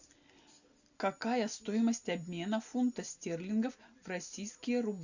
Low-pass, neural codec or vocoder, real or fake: 7.2 kHz; none; real